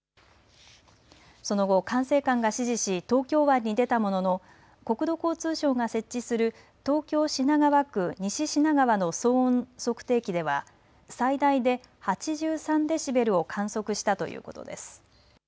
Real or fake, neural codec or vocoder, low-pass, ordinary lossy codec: real; none; none; none